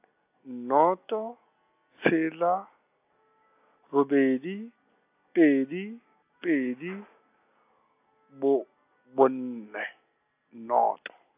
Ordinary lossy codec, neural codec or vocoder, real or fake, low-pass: AAC, 32 kbps; none; real; 3.6 kHz